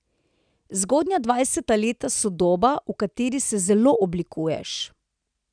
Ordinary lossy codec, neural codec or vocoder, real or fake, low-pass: none; none; real; 9.9 kHz